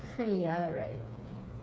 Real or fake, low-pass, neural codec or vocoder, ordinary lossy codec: fake; none; codec, 16 kHz, 4 kbps, FreqCodec, smaller model; none